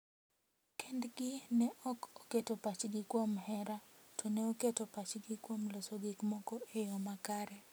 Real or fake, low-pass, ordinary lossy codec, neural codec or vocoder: real; none; none; none